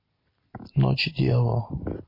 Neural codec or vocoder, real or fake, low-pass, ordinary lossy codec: none; real; 5.4 kHz; MP3, 32 kbps